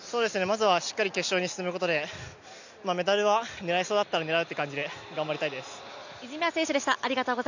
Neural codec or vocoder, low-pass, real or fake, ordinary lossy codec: none; 7.2 kHz; real; none